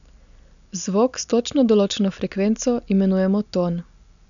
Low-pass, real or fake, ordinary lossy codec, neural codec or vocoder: 7.2 kHz; real; none; none